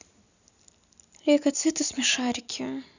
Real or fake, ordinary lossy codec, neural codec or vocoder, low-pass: real; none; none; 7.2 kHz